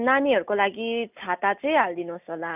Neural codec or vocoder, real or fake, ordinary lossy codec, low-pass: none; real; none; 3.6 kHz